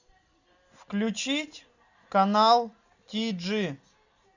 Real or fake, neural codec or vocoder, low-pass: real; none; 7.2 kHz